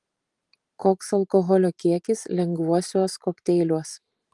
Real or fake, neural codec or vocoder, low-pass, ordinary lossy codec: fake; vocoder, 44.1 kHz, 128 mel bands every 512 samples, BigVGAN v2; 10.8 kHz; Opus, 24 kbps